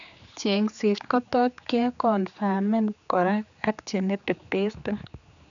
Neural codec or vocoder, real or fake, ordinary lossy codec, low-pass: codec, 16 kHz, 4 kbps, X-Codec, HuBERT features, trained on general audio; fake; none; 7.2 kHz